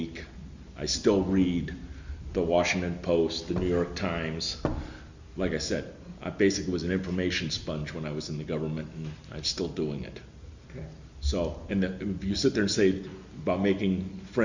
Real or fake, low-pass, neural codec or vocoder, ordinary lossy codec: real; 7.2 kHz; none; Opus, 64 kbps